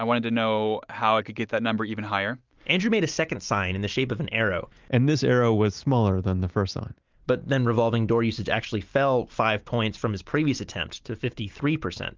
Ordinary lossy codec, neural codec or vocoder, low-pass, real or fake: Opus, 32 kbps; none; 7.2 kHz; real